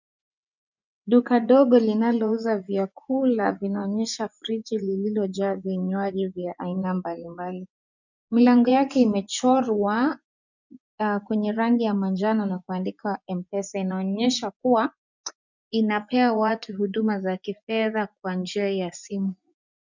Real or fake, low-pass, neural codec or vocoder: fake; 7.2 kHz; vocoder, 24 kHz, 100 mel bands, Vocos